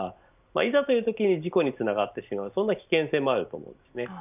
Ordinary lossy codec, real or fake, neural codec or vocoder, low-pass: none; real; none; 3.6 kHz